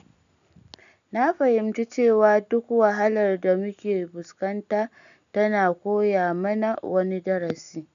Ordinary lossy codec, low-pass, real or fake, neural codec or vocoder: none; 7.2 kHz; real; none